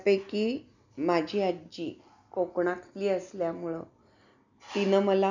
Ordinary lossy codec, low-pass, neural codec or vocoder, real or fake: none; 7.2 kHz; none; real